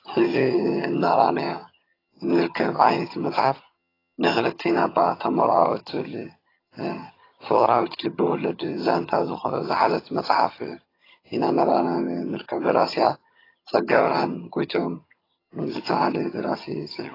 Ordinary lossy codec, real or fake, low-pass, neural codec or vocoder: AAC, 24 kbps; fake; 5.4 kHz; vocoder, 22.05 kHz, 80 mel bands, HiFi-GAN